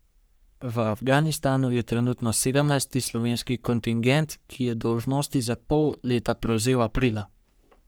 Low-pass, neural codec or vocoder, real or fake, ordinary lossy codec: none; codec, 44.1 kHz, 3.4 kbps, Pupu-Codec; fake; none